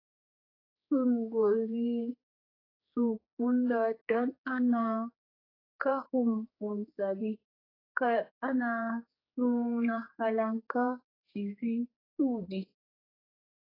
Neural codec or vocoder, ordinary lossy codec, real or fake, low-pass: codec, 16 kHz, 4 kbps, X-Codec, HuBERT features, trained on general audio; AAC, 24 kbps; fake; 5.4 kHz